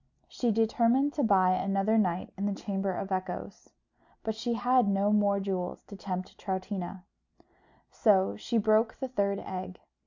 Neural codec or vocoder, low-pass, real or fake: none; 7.2 kHz; real